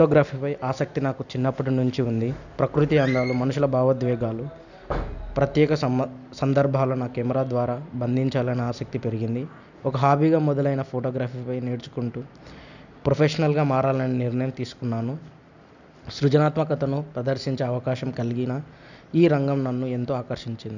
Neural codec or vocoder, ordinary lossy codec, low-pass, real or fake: none; none; 7.2 kHz; real